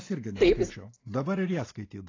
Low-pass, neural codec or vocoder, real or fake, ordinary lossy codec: 7.2 kHz; none; real; AAC, 32 kbps